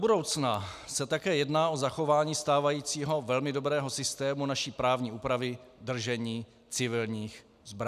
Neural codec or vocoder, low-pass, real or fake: none; 14.4 kHz; real